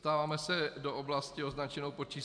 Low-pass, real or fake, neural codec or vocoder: 9.9 kHz; real; none